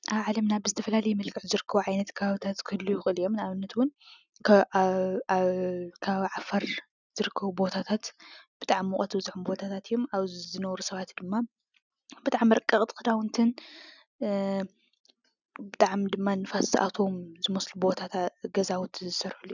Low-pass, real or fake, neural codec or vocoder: 7.2 kHz; real; none